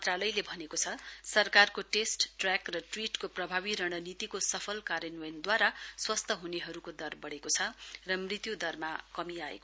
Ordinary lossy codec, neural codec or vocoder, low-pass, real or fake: none; none; none; real